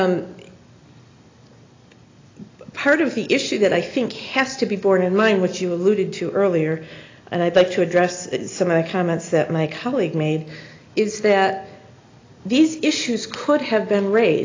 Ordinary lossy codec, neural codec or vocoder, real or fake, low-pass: AAC, 32 kbps; none; real; 7.2 kHz